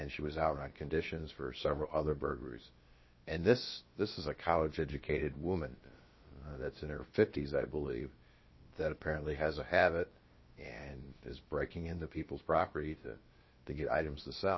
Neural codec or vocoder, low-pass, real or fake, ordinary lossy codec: codec, 16 kHz, about 1 kbps, DyCAST, with the encoder's durations; 7.2 kHz; fake; MP3, 24 kbps